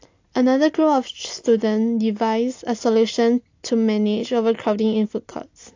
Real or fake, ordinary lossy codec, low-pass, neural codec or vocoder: real; AAC, 48 kbps; 7.2 kHz; none